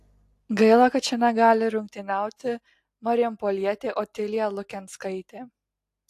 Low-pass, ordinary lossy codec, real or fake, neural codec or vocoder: 14.4 kHz; AAC, 64 kbps; real; none